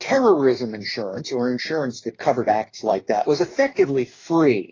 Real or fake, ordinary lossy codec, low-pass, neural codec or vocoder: fake; AAC, 32 kbps; 7.2 kHz; codec, 44.1 kHz, 2.6 kbps, DAC